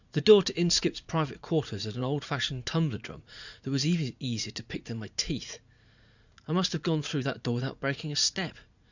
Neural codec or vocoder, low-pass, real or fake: vocoder, 44.1 kHz, 80 mel bands, Vocos; 7.2 kHz; fake